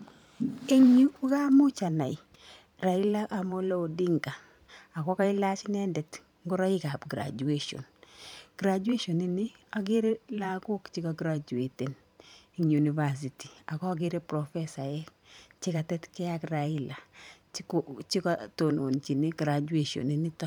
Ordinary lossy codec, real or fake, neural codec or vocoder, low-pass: none; fake; vocoder, 44.1 kHz, 128 mel bands every 512 samples, BigVGAN v2; 19.8 kHz